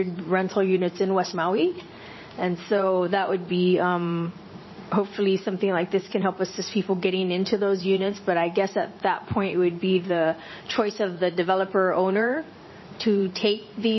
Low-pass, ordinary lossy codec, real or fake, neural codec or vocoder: 7.2 kHz; MP3, 24 kbps; fake; vocoder, 44.1 kHz, 80 mel bands, Vocos